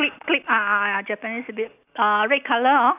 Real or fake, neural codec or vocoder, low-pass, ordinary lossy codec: real; none; 3.6 kHz; none